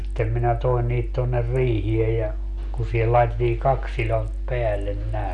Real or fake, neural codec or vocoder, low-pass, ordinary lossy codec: real; none; 10.8 kHz; none